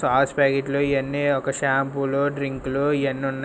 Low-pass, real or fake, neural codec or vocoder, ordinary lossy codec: none; real; none; none